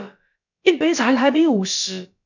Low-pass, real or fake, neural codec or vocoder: 7.2 kHz; fake; codec, 16 kHz, about 1 kbps, DyCAST, with the encoder's durations